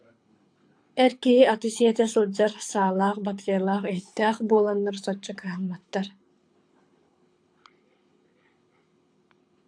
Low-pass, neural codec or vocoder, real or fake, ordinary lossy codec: 9.9 kHz; codec, 24 kHz, 6 kbps, HILCodec; fake; AAC, 64 kbps